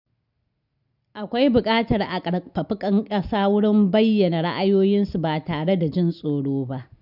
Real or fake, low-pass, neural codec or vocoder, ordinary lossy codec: real; 5.4 kHz; none; none